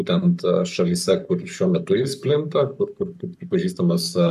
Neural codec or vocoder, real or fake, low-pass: codec, 44.1 kHz, 7.8 kbps, Pupu-Codec; fake; 14.4 kHz